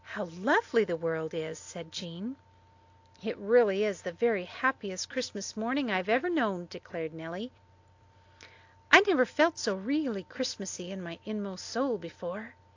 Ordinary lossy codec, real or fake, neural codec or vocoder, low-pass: AAC, 48 kbps; real; none; 7.2 kHz